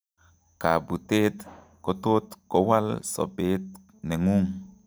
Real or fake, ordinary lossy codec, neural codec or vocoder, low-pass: real; none; none; none